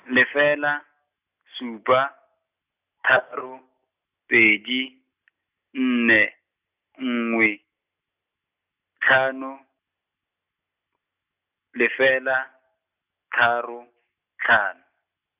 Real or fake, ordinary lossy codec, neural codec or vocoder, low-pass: real; Opus, 64 kbps; none; 3.6 kHz